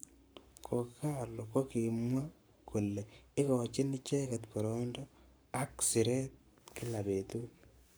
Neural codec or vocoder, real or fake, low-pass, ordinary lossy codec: codec, 44.1 kHz, 7.8 kbps, Pupu-Codec; fake; none; none